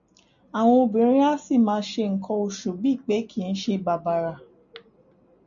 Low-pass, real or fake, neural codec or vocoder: 7.2 kHz; real; none